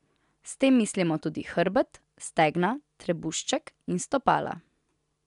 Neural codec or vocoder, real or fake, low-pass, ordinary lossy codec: none; real; 10.8 kHz; MP3, 96 kbps